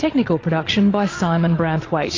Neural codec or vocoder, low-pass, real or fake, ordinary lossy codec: vocoder, 22.05 kHz, 80 mel bands, Vocos; 7.2 kHz; fake; AAC, 32 kbps